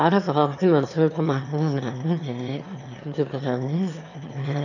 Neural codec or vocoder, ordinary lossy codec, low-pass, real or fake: autoencoder, 22.05 kHz, a latent of 192 numbers a frame, VITS, trained on one speaker; none; 7.2 kHz; fake